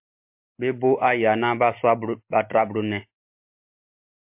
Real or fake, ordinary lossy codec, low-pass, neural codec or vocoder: real; MP3, 32 kbps; 3.6 kHz; none